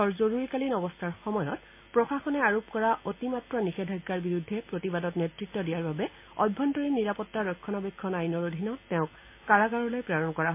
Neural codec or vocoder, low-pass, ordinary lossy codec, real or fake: none; 3.6 kHz; MP3, 24 kbps; real